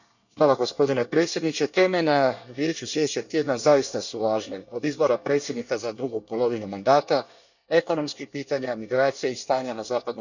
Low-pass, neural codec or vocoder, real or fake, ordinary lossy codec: 7.2 kHz; codec, 24 kHz, 1 kbps, SNAC; fake; none